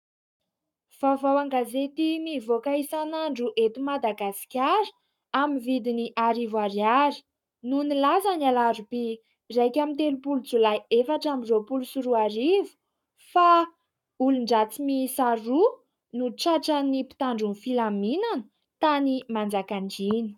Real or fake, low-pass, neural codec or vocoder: fake; 19.8 kHz; codec, 44.1 kHz, 7.8 kbps, Pupu-Codec